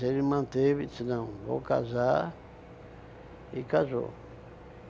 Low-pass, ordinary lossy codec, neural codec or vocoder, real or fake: none; none; none; real